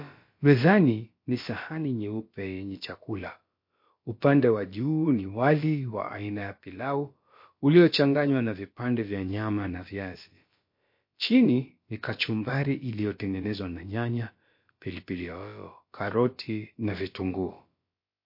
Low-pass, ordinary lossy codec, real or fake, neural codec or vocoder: 5.4 kHz; MP3, 32 kbps; fake; codec, 16 kHz, about 1 kbps, DyCAST, with the encoder's durations